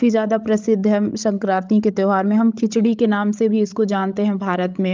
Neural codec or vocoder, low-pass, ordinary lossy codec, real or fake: codec, 16 kHz, 16 kbps, FreqCodec, larger model; 7.2 kHz; Opus, 24 kbps; fake